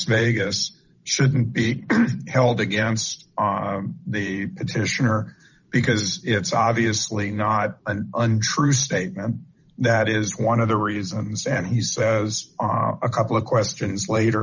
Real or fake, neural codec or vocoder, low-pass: fake; vocoder, 44.1 kHz, 128 mel bands every 512 samples, BigVGAN v2; 7.2 kHz